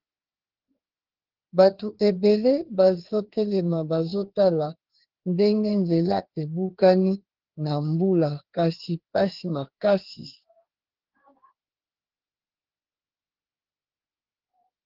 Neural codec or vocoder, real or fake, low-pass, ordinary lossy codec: codec, 16 kHz, 2 kbps, FreqCodec, larger model; fake; 5.4 kHz; Opus, 16 kbps